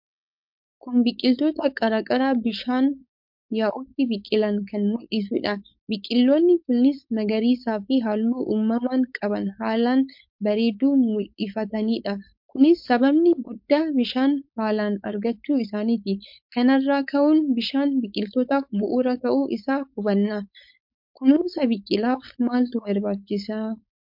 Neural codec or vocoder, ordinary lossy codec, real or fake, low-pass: codec, 16 kHz, 4.8 kbps, FACodec; MP3, 48 kbps; fake; 5.4 kHz